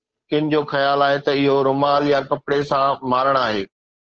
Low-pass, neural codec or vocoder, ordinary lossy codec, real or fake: 7.2 kHz; codec, 16 kHz, 8 kbps, FunCodec, trained on Chinese and English, 25 frames a second; Opus, 16 kbps; fake